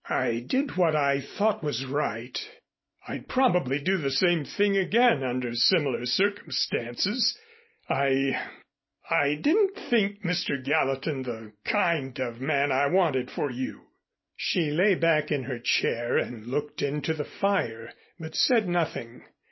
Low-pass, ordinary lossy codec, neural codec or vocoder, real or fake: 7.2 kHz; MP3, 24 kbps; none; real